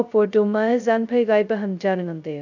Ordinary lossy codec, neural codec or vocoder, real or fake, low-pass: none; codec, 16 kHz, 0.2 kbps, FocalCodec; fake; 7.2 kHz